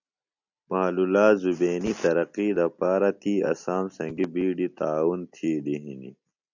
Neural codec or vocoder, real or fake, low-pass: none; real; 7.2 kHz